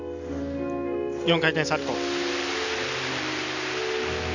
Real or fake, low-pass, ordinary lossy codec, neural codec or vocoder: real; 7.2 kHz; none; none